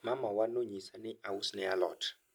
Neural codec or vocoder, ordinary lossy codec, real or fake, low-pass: none; none; real; none